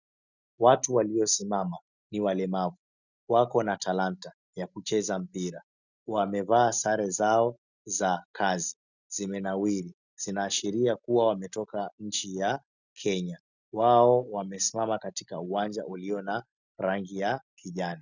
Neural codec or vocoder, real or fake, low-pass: none; real; 7.2 kHz